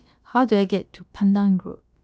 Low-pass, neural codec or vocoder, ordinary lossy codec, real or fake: none; codec, 16 kHz, about 1 kbps, DyCAST, with the encoder's durations; none; fake